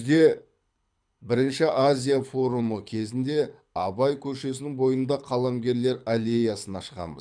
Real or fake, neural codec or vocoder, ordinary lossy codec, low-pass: fake; codec, 24 kHz, 6 kbps, HILCodec; none; 9.9 kHz